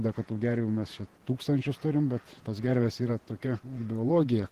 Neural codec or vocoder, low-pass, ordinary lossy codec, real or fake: vocoder, 48 kHz, 128 mel bands, Vocos; 14.4 kHz; Opus, 16 kbps; fake